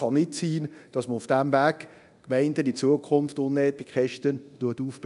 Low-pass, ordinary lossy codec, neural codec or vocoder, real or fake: 10.8 kHz; none; codec, 24 kHz, 0.9 kbps, DualCodec; fake